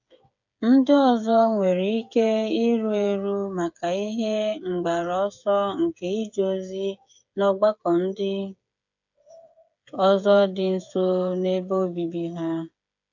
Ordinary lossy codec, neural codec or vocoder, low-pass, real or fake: none; codec, 16 kHz, 8 kbps, FreqCodec, smaller model; 7.2 kHz; fake